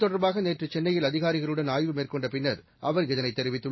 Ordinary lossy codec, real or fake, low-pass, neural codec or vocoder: MP3, 24 kbps; real; 7.2 kHz; none